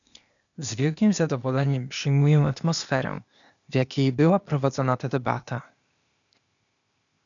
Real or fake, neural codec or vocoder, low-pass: fake; codec, 16 kHz, 0.8 kbps, ZipCodec; 7.2 kHz